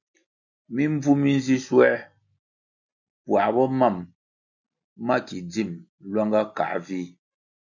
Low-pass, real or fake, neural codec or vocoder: 7.2 kHz; real; none